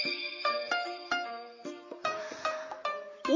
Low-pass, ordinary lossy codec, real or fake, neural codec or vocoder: 7.2 kHz; MP3, 48 kbps; fake; vocoder, 44.1 kHz, 128 mel bands, Pupu-Vocoder